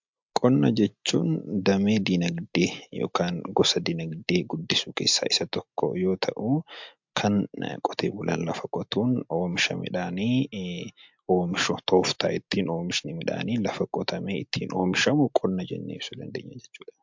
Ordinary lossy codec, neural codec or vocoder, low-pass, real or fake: MP3, 64 kbps; none; 7.2 kHz; real